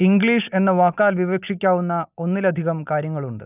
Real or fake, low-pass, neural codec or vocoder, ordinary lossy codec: real; 3.6 kHz; none; none